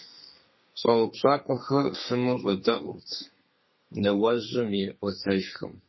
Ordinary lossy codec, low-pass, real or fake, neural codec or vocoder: MP3, 24 kbps; 7.2 kHz; fake; codec, 32 kHz, 1.9 kbps, SNAC